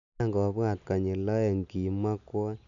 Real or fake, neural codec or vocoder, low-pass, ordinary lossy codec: real; none; 7.2 kHz; none